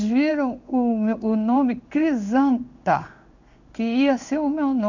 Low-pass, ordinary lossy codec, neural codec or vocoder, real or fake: 7.2 kHz; none; codec, 16 kHz in and 24 kHz out, 1 kbps, XY-Tokenizer; fake